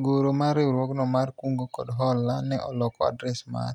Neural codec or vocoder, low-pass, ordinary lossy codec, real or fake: none; 19.8 kHz; none; real